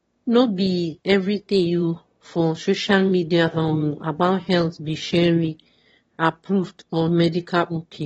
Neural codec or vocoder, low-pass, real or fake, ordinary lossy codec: autoencoder, 22.05 kHz, a latent of 192 numbers a frame, VITS, trained on one speaker; 9.9 kHz; fake; AAC, 24 kbps